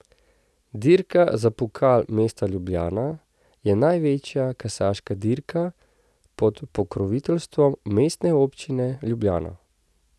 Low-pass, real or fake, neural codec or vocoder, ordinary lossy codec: none; real; none; none